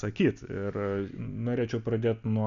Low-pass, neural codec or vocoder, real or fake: 7.2 kHz; none; real